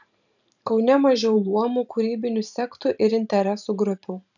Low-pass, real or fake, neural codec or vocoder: 7.2 kHz; fake; vocoder, 44.1 kHz, 128 mel bands every 512 samples, BigVGAN v2